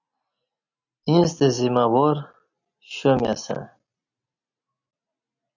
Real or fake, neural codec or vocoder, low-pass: real; none; 7.2 kHz